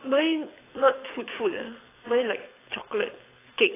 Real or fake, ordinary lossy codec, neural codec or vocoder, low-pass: fake; AAC, 16 kbps; codec, 24 kHz, 6 kbps, HILCodec; 3.6 kHz